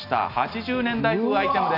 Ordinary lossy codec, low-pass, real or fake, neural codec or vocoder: none; 5.4 kHz; real; none